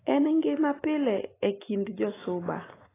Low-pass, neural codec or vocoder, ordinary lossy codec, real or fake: 3.6 kHz; none; AAC, 16 kbps; real